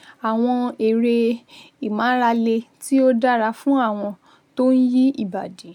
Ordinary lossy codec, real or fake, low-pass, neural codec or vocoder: none; real; 19.8 kHz; none